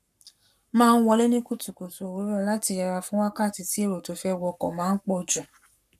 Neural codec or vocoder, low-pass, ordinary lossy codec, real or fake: codec, 44.1 kHz, 7.8 kbps, Pupu-Codec; 14.4 kHz; none; fake